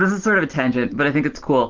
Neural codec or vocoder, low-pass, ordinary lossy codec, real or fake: none; 7.2 kHz; Opus, 16 kbps; real